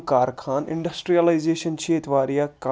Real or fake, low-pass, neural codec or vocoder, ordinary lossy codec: real; none; none; none